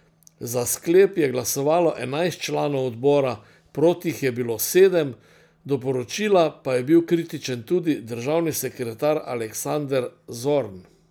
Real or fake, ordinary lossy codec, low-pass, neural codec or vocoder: real; none; none; none